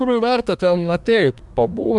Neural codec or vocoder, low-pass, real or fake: codec, 24 kHz, 1 kbps, SNAC; 10.8 kHz; fake